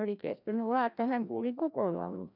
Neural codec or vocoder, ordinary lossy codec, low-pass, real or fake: codec, 16 kHz, 1 kbps, FreqCodec, larger model; none; 5.4 kHz; fake